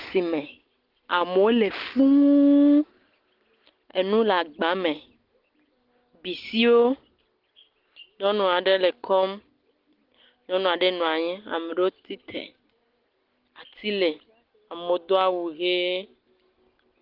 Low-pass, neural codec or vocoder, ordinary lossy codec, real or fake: 5.4 kHz; none; Opus, 16 kbps; real